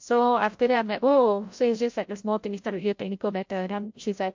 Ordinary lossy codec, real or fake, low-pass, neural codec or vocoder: MP3, 48 kbps; fake; 7.2 kHz; codec, 16 kHz, 0.5 kbps, FreqCodec, larger model